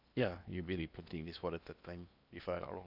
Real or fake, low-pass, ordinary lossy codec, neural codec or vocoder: fake; 5.4 kHz; none; codec, 16 kHz in and 24 kHz out, 0.8 kbps, FocalCodec, streaming, 65536 codes